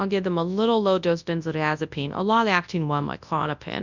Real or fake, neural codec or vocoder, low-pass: fake; codec, 24 kHz, 0.9 kbps, WavTokenizer, large speech release; 7.2 kHz